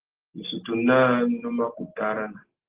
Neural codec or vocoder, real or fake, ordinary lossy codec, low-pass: none; real; Opus, 16 kbps; 3.6 kHz